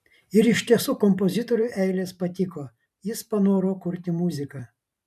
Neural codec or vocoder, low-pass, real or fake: vocoder, 44.1 kHz, 128 mel bands every 512 samples, BigVGAN v2; 14.4 kHz; fake